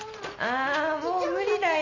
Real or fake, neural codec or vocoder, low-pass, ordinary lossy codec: real; none; 7.2 kHz; none